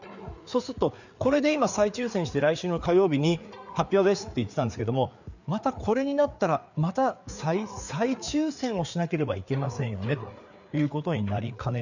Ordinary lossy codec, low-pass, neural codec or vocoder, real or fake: none; 7.2 kHz; codec, 16 kHz, 4 kbps, FreqCodec, larger model; fake